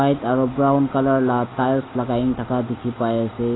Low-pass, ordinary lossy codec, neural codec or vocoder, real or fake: 7.2 kHz; AAC, 16 kbps; none; real